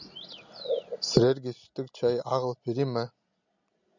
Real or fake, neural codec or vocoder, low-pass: real; none; 7.2 kHz